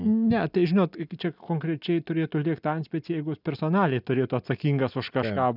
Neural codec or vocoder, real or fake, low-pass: none; real; 5.4 kHz